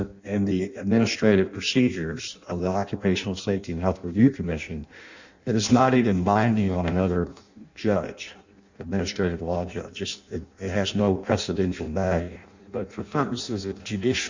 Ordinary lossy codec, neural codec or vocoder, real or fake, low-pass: Opus, 64 kbps; codec, 16 kHz in and 24 kHz out, 0.6 kbps, FireRedTTS-2 codec; fake; 7.2 kHz